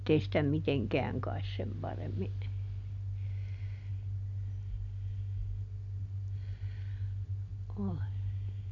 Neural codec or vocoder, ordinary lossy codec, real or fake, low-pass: none; none; real; 7.2 kHz